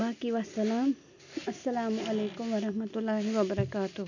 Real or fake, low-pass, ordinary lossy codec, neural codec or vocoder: real; 7.2 kHz; none; none